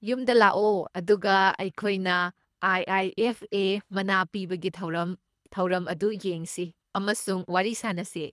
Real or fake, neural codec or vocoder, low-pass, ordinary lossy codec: fake; codec, 24 kHz, 3 kbps, HILCodec; none; none